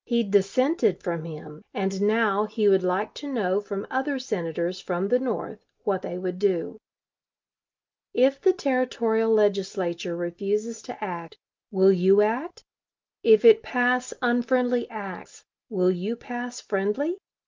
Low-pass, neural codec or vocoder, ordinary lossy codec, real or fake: 7.2 kHz; none; Opus, 24 kbps; real